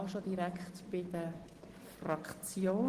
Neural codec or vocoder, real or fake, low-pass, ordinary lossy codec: none; real; 14.4 kHz; Opus, 16 kbps